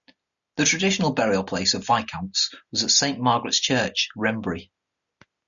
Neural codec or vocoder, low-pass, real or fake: none; 7.2 kHz; real